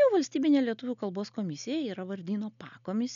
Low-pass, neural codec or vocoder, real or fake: 7.2 kHz; none; real